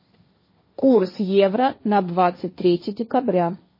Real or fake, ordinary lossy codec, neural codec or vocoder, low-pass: fake; MP3, 24 kbps; codec, 16 kHz, 1.1 kbps, Voila-Tokenizer; 5.4 kHz